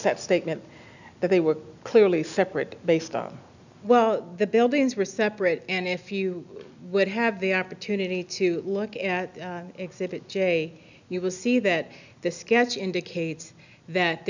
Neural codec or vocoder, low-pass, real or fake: none; 7.2 kHz; real